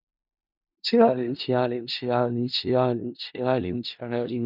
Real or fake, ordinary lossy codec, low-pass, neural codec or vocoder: fake; MP3, 48 kbps; 5.4 kHz; codec, 16 kHz in and 24 kHz out, 0.4 kbps, LongCat-Audio-Codec, four codebook decoder